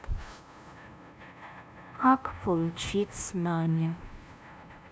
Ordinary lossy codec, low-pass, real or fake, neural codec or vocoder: none; none; fake; codec, 16 kHz, 0.5 kbps, FunCodec, trained on LibriTTS, 25 frames a second